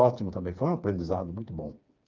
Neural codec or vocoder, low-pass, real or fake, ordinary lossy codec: codec, 16 kHz, 4 kbps, FreqCodec, smaller model; 7.2 kHz; fake; Opus, 24 kbps